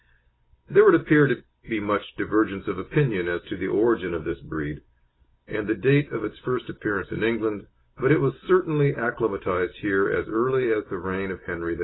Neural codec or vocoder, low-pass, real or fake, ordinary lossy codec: none; 7.2 kHz; real; AAC, 16 kbps